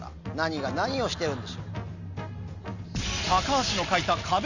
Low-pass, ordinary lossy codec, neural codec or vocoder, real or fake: 7.2 kHz; none; none; real